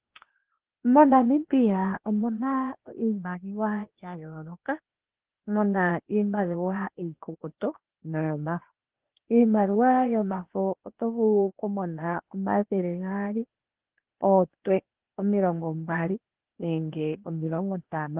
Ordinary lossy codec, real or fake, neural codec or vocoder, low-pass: Opus, 16 kbps; fake; codec, 16 kHz, 0.8 kbps, ZipCodec; 3.6 kHz